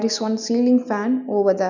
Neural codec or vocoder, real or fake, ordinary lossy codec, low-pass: none; real; none; 7.2 kHz